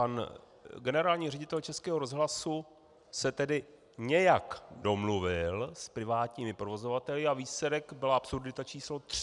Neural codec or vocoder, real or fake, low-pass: none; real; 10.8 kHz